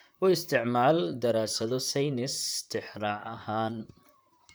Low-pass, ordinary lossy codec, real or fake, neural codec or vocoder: none; none; fake; vocoder, 44.1 kHz, 128 mel bands, Pupu-Vocoder